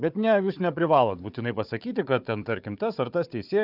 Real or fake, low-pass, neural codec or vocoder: fake; 5.4 kHz; codec, 16 kHz, 4 kbps, FunCodec, trained on Chinese and English, 50 frames a second